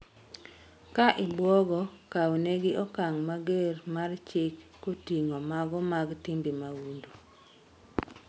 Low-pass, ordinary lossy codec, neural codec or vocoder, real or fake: none; none; none; real